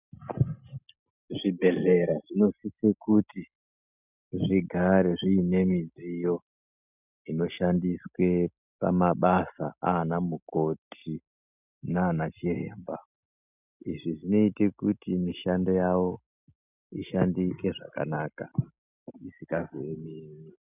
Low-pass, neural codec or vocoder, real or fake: 3.6 kHz; none; real